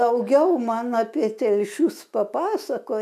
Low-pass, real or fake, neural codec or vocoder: 14.4 kHz; fake; autoencoder, 48 kHz, 128 numbers a frame, DAC-VAE, trained on Japanese speech